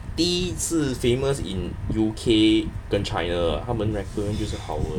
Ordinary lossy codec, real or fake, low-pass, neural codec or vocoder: none; real; 19.8 kHz; none